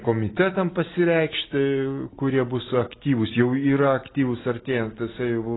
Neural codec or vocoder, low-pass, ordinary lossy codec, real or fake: none; 7.2 kHz; AAC, 16 kbps; real